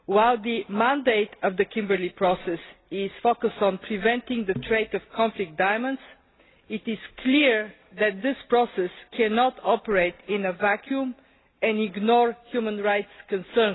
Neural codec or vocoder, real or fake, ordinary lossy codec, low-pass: none; real; AAC, 16 kbps; 7.2 kHz